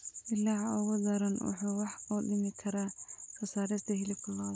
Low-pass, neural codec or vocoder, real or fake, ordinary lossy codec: none; none; real; none